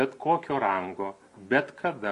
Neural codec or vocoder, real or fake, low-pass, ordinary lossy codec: none; real; 14.4 kHz; MP3, 48 kbps